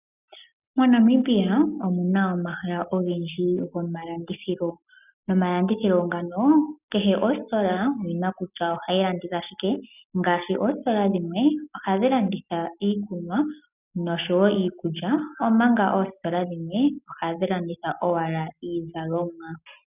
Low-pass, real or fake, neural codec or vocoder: 3.6 kHz; real; none